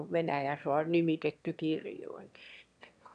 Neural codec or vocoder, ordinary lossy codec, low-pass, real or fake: autoencoder, 22.05 kHz, a latent of 192 numbers a frame, VITS, trained on one speaker; none; 9.9 kHz; fake